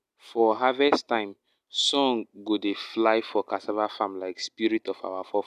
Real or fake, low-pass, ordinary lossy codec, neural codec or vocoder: real; 14.4 kHz; none; none